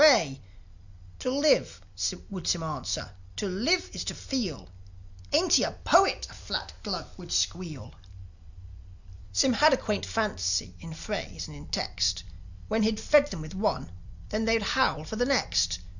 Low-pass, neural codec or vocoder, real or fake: 7.2 kHz; none; real